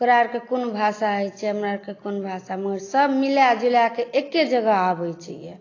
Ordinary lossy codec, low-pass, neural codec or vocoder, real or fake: AAC, 32 kbps; 7.2 kHz; none; real